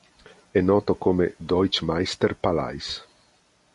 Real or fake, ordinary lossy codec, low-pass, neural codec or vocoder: real; MP3, 48 kbps; 14.4 kHz; none